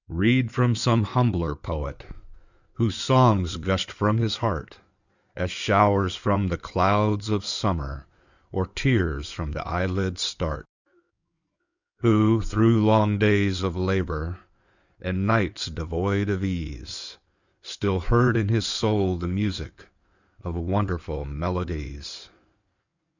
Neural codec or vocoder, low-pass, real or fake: codec, 16 kHz in and 24 kHz out, 2.2 kbps, FireRedTTS-2 codec; 7.2 kHz; fake